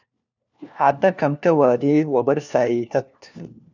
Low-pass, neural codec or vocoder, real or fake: 7.2 kHz; codec, 16 kHz, 1 kbps, FunCodec, trained on LibriTTS, 50 frames a second; fake